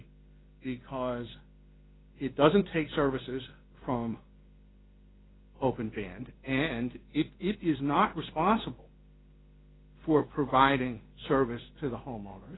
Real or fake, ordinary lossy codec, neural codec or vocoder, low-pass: fake; AAC, 16 kbps; codec, 16 kHz in and 24 kHz out, 1 kbps, XY-Tokenizer; 7.2 kHz